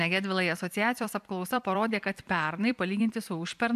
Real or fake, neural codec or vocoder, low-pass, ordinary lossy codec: fake; vocoder, 44.1 kHz, 128 mel bands every 256 samples, BigVGAN v2; 14.4 kHz; AAC, 96 kbps